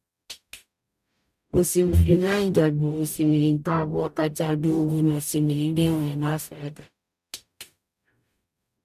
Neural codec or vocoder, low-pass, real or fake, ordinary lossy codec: codec, 44.1 kHz, 0.9 kbps, DAC; 14.4 kHz; fake; none